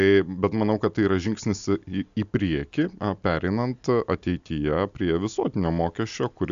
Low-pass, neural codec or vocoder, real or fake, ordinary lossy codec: 7.2 kHz; none; real; AAC, 96 kbps